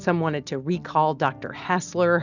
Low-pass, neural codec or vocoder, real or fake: 7.2 kHz; none; real